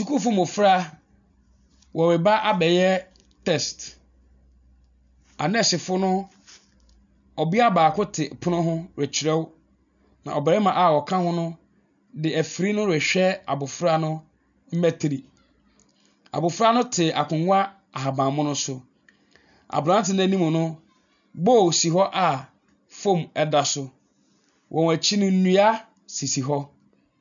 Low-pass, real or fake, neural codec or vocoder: 7.2 kHz; real; none